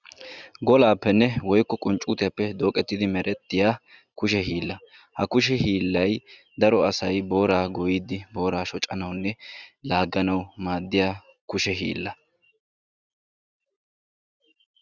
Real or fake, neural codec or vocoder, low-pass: real; none; 7.2 kHz